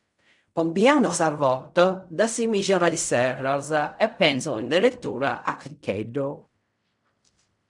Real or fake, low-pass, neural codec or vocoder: fake; 10.8 kHz; codec, 16 kHz in and 24 kHz out, 0.4 kbps, LongCat-Audio-Codec, fine tuned four codebook decoder